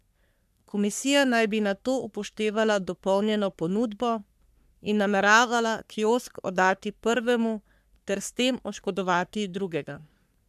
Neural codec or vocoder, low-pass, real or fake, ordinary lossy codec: codec, 44.1 kHz, 3.4 kbps, Pupu-Codec; 14.4 kHz; fake; none